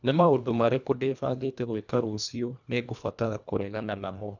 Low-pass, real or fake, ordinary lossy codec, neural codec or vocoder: 7.2 kHz; fake; none; codec, 24 kHz, 1.5 kbps, HILCodec